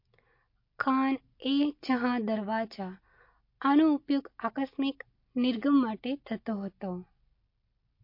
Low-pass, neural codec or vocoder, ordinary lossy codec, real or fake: 5.4 kHz; none; MP3, 32 kbps; real